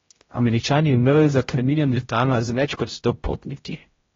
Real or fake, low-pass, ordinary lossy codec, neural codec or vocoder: fake; 7.2 kHz; AAC, 24 kbps; codec, 16 kHz, 0.5 kbps, X-Codec, HuBERT features, trained on general audio